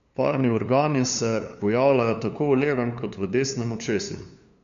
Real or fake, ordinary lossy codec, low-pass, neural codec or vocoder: fake; MP3, 64 kbps; 7.2 kHz; codec, 16 kHz, 2 kbps, FunCodec, trained on LibriTTS, 25 frames a second